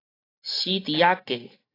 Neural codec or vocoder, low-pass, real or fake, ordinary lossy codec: none; 5.4 kHz; real; AAC, 24 kbps